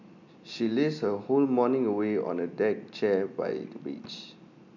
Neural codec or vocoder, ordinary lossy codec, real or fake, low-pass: none; none; real; 7.2 kHz